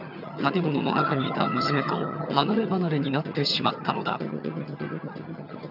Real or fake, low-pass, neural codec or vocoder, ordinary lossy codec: fake; 5.4 kHz; vocoder, 22.05 kHz, 80 mel bands, HiFi-GAN; none